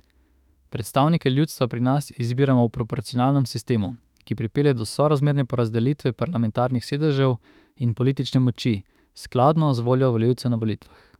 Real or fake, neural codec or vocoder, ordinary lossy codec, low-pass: fake; autoencoder, 48 kHz, 32 numbers a frame, DAC-VAE, trained on Japanese speech; none; 19.8 kHz